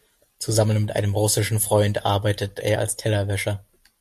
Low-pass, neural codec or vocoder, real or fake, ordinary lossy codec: 14.4 kHz; none; real; MP3, 64 kbps